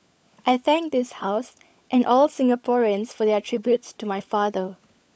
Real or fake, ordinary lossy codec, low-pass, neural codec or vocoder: fake; none; none; codec, 16 kHz, 16 kbps, FunCodec, trained on LibriTTS, 50 frames a second